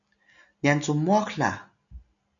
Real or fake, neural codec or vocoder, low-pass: real; none; 7.2 kHz